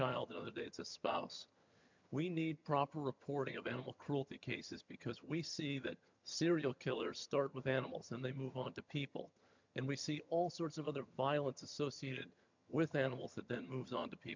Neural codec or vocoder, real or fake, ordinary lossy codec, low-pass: vocoder, 22.05 kHz, 80 mel bands, HiFi-GAN; fake; MP3, 64 kbps; 7.2 kHz